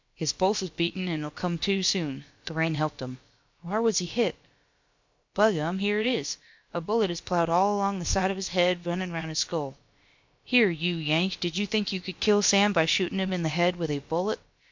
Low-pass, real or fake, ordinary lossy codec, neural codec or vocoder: 7.2 kHz; fake; MP3, 48 kbps; codec, 16 kHz, about 1 kbps, DyCAST, with the encoder's durations